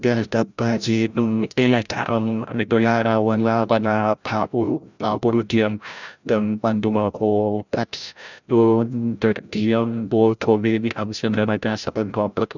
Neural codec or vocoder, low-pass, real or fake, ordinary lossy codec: codec, 16 kHz, 0.5 kbps, FreqCodec, larger model; 7.2 kHz; fake; none